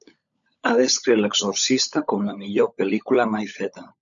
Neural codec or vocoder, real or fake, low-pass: codec, 16 kHz, 16 kbps, FunCodec, trained on LibriTTS, 50 frames a second; fake; 7.2 kHz